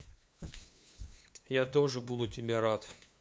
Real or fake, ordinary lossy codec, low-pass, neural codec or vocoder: fake; none; none; codec, 16 kHz, 2 kbps, FunCodec, trained on LibriTTS, 25 frames a second